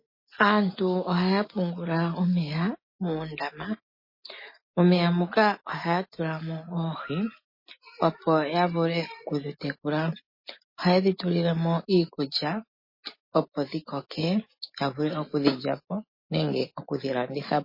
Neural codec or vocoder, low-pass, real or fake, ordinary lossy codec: none; 5.4 kHz; real; MP3, 24 kbps